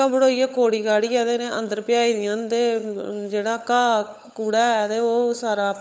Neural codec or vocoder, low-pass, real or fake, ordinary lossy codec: codec, 16 kHz, 16 kbps, FunCodec, trained on LibriTTS, 50 frames a second; none; fake; none